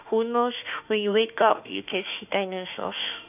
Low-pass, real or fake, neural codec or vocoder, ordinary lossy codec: 3.6 kHz; fake; autoencoder, 48 kHz, 32 numbers a frame, DAC-VAE, trained on Japanese speech; none